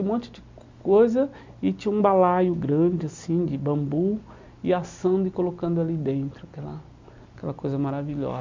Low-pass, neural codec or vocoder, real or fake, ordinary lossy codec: 7.2 kHz; none; real; MP3, 64 kbps